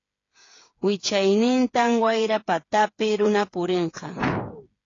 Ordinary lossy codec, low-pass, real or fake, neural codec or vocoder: AAC, 32 kbps; 7.2 kHz; fake; codec, 16 kHz, 8 kbps, FreqCodec, smaller model